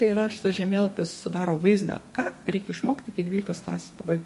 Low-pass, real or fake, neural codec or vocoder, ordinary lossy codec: 14.4 kHz; fake; codec, 32 kHz, 1.9 kbps, SNAC; MP3, 48 kbps